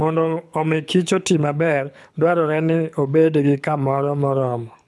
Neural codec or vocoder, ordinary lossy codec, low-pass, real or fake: codec, 24 kHz, 6 kbps, HILCodec; none; none; fake